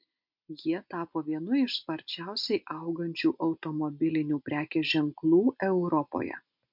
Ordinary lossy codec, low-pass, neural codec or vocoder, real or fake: MP3, 48 kbps; 5.4 kHz; none; real